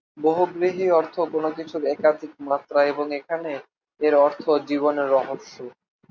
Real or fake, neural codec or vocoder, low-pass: real; none; 7.2 kHz